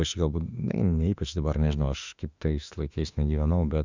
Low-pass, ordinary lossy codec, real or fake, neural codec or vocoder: 7.2 kHz; Opus, 64 kbps; fake; autoencoder, 48 kHz, 32 numbers a frame, DAC-VAE, trained on Japanese speech